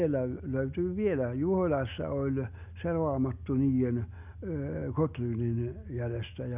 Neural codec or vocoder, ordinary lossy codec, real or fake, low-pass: none; none; real; 3.6 kHz